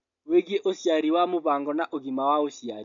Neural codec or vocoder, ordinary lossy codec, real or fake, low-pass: none; MP3, 96 kbps; real; 7.2 kHz